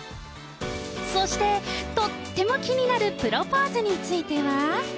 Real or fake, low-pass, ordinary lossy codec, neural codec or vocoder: real; none; none; none